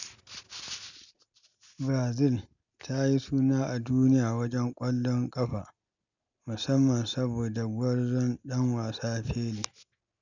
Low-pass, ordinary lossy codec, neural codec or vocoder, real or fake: 7.2 kHz; none; none; real